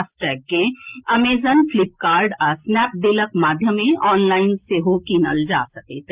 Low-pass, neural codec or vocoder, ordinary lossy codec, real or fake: 3.6 kHz; none; Opus, 32 kbps; real